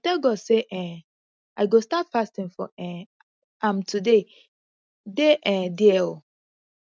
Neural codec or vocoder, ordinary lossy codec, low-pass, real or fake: none; none; none; real